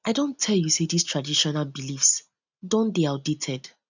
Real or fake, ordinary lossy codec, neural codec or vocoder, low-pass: real; none; none; 7.2 kHz